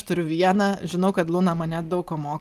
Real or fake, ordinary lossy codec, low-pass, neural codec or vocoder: real; Opus, 24 kbps; 14.4 kHz; none